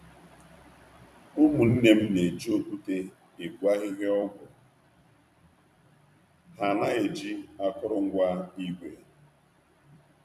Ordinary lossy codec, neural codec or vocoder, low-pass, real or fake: none; vocoder, 44.1 kHz, 128 mel bands every 256 samples, BigVGAN v2; 14.4 kHz; fake